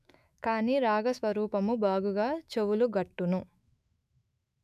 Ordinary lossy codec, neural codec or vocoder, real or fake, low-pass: none; autoencoder, 48 kHz, 128 numbers a frame, DAC-VAE, trained on Japanese speech; fake; 14.4 kHz